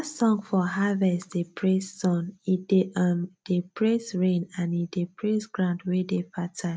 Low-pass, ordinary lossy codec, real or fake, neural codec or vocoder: none; none; real; none